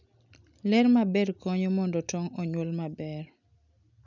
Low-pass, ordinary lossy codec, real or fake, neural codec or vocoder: 7.2 kHz; none; real; none